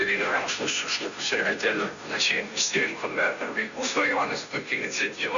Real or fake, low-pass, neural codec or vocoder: fake; 7.2 kHz; codec, 16 kHz, 0.5 kbps, FunCodec, trained on Chinese and English, 25 frames a second